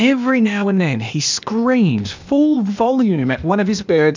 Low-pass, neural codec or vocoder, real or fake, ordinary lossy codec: 7.2 kHz; codec, 16 kHz, 0.8 kbps, ZipCodec; fake; MP3, 64 kbps